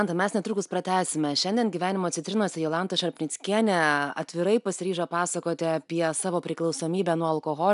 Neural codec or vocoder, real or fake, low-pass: none; real; 10.8 kHz